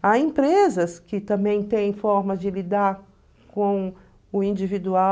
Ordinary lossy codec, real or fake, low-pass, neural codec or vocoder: none; real; none; none